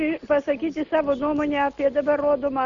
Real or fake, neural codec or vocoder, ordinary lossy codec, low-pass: real; none; Opus, 64 kbps; 7.2 kHz